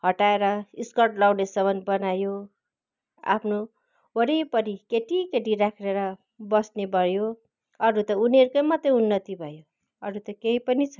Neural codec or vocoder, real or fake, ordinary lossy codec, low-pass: none; real; none; 7.2 kHz